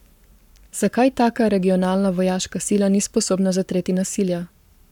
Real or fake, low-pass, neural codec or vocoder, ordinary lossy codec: fake; 19.8 kHz; codec, 44.1 kHz, 7.8 kbps, Pupu-Codec; none